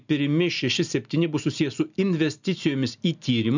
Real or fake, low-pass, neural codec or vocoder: real; 7.2 kHz; none